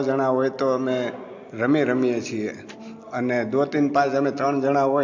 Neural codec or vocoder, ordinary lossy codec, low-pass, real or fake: none; AAC, 48 kbps; 7.2 kHz; real